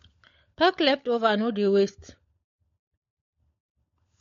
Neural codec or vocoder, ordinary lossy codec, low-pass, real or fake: codec, 16 kHz, 16 kbps, FunCodec, trained on LibriTTS, 50 frames a second; MP3, 48 kbps; 7.2 kHz; fake